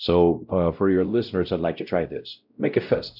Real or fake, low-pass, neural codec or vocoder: fake; 5.4 kHz; codec, 16 kHz, 0.5 kbps, X-Codec, WavLM features, trained on Multilingual LibriSpeech